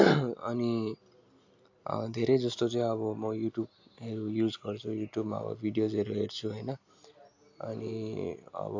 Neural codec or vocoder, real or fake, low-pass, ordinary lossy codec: none; real; 7.2 kHz; AAC, 48 kbps